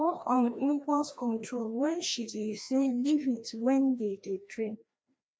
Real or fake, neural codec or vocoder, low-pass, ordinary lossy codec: fake; codec, 16 kHz, 1 kbps, FreqCodec, larger model; none; none